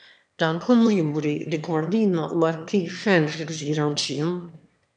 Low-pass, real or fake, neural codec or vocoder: 9.9 kHz; fake; autoencoder, 22.05 kHz, a latent of 192 numbers a frame, VITS, trained on one speaker